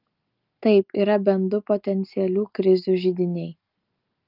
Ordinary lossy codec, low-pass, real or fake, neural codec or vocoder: Opus, 24 kbps; 5.4 kHz; real; none